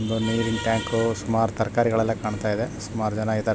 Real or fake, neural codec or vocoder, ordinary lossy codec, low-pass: real; none; none; none